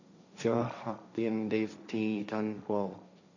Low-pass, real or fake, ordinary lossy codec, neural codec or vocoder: 7.2 kHz; fake; none; codec, 16 kHz, 1.1 kbps, Voila-Tokenizer